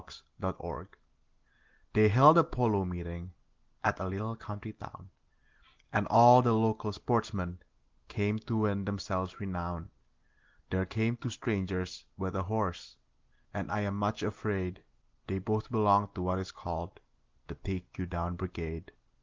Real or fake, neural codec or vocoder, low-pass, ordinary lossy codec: real; none; 7.2 kHz; Opus, 16 kbps